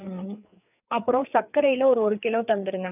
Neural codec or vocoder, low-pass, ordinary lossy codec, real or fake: codec, 16 kHz, 4 kbps, FreqCodec, larger model; 3.6 kHz; none; fake